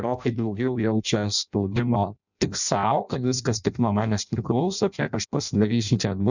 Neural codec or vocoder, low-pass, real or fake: codec, 16 kHz in and 24 kHz out, 0.6 kbps, FireRedTTS-2 codec; 7.2 kHz; fake